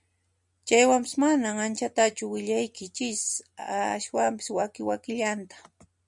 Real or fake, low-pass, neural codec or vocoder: real; 10.8 kHz; none